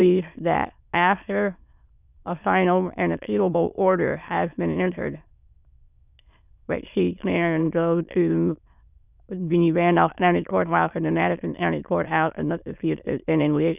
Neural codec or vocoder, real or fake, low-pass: autoencoder, 22.05 kHz, a latent of 192 numbers a frame, VITS, trained on many speakers; fake; 3.6 kHz